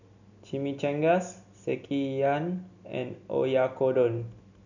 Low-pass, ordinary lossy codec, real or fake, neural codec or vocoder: 7.2 kHz; none; real; none